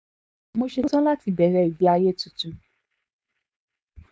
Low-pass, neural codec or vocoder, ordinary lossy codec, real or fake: none; codec, 16 kHz, 4.8 kbps, FACodec; none; fake